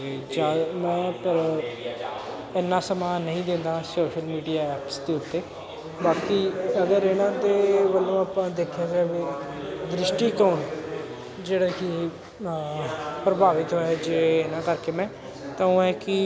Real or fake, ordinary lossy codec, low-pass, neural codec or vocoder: real; none; none; none